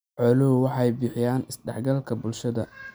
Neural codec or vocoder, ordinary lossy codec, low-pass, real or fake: none; none; none; real